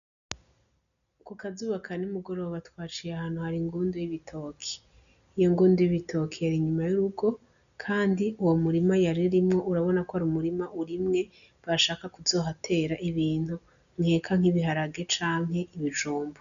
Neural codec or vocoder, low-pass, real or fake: none; 7.2 kHz; real